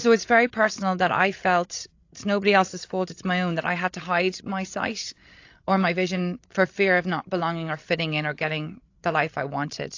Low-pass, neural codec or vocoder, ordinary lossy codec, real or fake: 7.2 kHz; codec, 16 kHz, 16 kbps, FreqCodec, larger model; AAC, 48 kbps; fake